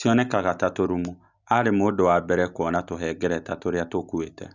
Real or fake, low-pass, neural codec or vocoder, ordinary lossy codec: real; 7.2 kHz; none; none